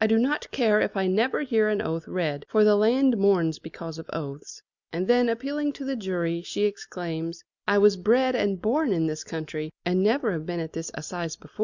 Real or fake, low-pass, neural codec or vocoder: real; 7.2 kHz; none